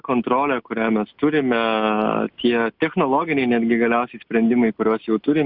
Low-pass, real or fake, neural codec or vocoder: 5.4 kHz; real; none